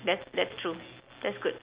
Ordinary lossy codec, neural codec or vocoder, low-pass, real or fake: Opus, 64 kbps; none; 3.6 kHz; real